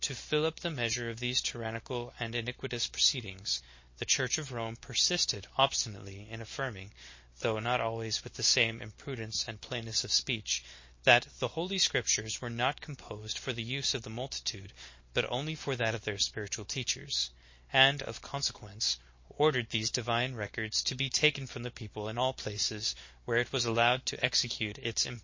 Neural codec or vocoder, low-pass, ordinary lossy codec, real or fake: none; 7.2 kHz; MP3, 32 kbps; real